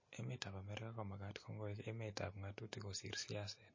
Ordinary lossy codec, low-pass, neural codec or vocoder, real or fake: MP3, 32 kbps; 7.2 kHz; none; real